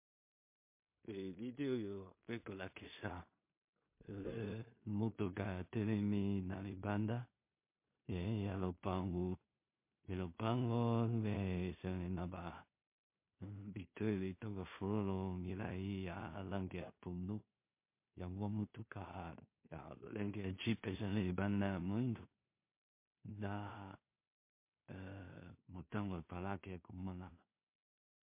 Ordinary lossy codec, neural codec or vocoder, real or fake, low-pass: MP3, 24 kbps; codec, 16 kHz in and 24 kHz out, 0.4 kbps, LongCat-Audio-Codec, two codebook decoder; fake; 3.6 kHz